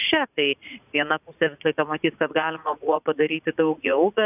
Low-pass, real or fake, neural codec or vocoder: 3.6 kHz; fake; vocoder, 44.1 kHz, 80 mel bands, Vocos